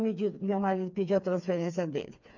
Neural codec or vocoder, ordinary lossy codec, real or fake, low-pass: codec, 16 kHz, 4 kbps, FreqCodec, smaller model; none; fake; 7.2 kHz